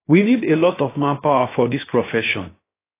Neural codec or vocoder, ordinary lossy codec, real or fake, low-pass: codec, 16 kHz, 0.7 kbps, FocalCodec; AAC, 24 kbps; fake; 3.6 kHz